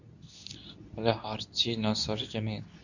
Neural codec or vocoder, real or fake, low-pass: codec, 24 kHz, 0.9 kbps, WavTokenizer, medium speech release version 2; fake; 7.2 kHz